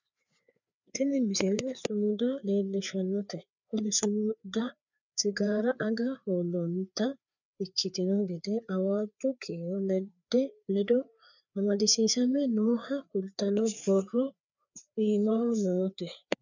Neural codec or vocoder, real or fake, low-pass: codec, 16 kHz, 4 kbps, FreqCodec, larger model; fake; 7.2 kHz